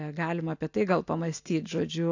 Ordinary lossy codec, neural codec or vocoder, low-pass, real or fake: AAC, 48 kbps; none; 7.2 kHz; real